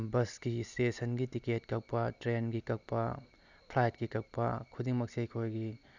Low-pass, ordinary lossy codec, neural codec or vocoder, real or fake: 7.2 kHz; none; none; real